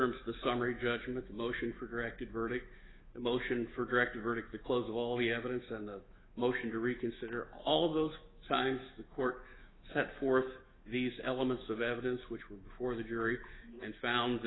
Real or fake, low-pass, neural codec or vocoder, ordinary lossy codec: fake; 7.2 kHz; autoencoder, 48 kHz, 128 numbers a frame, DAC-VAE, trained on Japanese speech; AAC, 16 kbps